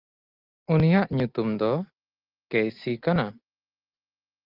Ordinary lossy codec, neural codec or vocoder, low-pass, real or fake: Opus, 32 kbps; none; 5.4 kHz; real